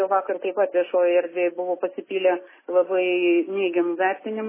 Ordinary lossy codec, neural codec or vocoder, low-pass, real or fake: MP3, 16 kbps; none; 3.6 kHz; real